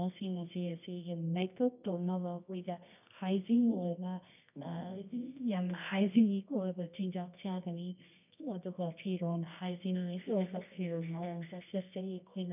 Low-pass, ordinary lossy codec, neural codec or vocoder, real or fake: 3.6 kHz; none; codec, 24 kHz, 0.9 kbps, WavTokenizer, medium music audio release; fake